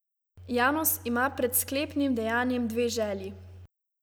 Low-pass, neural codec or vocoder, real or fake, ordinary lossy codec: none; none; real; none